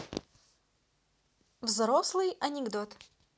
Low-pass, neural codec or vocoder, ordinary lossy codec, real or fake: none; none; none; real